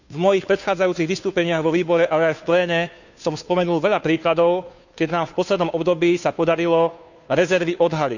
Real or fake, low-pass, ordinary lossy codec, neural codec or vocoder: fake; 7.2 kHz; none; codec, 16 kHz, 2 kbps, FunCodec, trained on Chinese and English, 25 frames a second